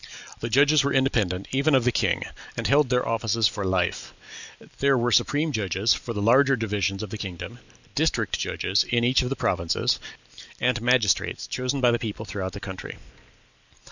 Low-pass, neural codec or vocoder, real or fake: 7.2 kHz; vocoder, 44.1 kHz, 128 mel bands every 256 samples, BigVGAN v2; fake